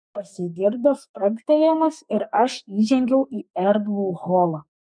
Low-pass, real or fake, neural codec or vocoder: 9.9 kHz; fake; codec, 32 kHz, 1.9 kbps, SNAC